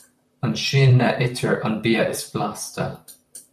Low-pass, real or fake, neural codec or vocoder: 14.4 kHz; fake; vocoder, 44.1 kHz, 128 mel bands, Pupu-Vocoder